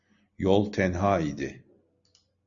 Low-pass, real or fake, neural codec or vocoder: 7.2 kHz; real; none